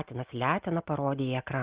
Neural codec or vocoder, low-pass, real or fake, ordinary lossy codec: none; 3.6 kHz; real; Opus, 16 kbps